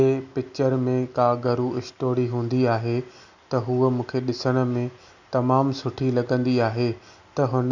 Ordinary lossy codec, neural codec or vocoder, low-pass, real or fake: none; none; 7.2 kHz; real